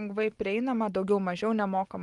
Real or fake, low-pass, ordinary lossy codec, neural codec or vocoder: real; 10.8 kHz; Opus, 24 kbps; none